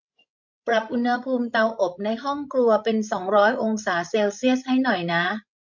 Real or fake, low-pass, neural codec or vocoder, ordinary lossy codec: fake; 7.2 kHz; codec, 16 kHz, 16 kbps, FreqCodec, larger model; MP3, 48 kbps